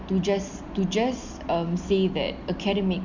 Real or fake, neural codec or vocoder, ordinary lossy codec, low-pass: real; none; none; 7.2 kHz